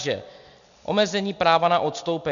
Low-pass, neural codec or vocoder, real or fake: 7.2 kHz; none; real